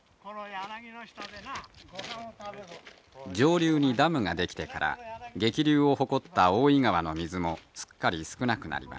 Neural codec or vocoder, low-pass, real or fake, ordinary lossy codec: none; none; real; none